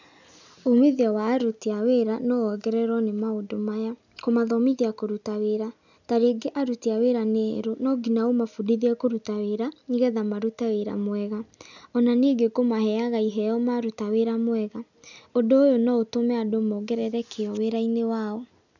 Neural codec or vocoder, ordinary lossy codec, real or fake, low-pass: none; none; real; 7.2 kHz